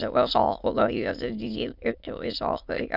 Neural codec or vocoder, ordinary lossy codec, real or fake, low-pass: autoencoder, 22.05 kHz, a latent of 192 numbers a frame, VITS, trained on many speakers; none; fake; 5.4 kHz